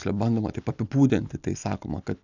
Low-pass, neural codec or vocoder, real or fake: 7.2 kHz; none; real